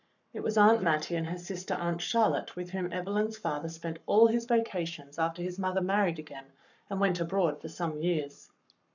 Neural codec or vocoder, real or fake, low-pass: codec, 44.1 kHz, 7.8 kbps, Pupu-Codec; fake; 7.2 kHz